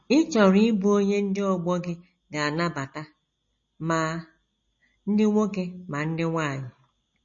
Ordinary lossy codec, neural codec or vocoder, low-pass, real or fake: MP3, 32 kbps; none; 7.2 kHz; real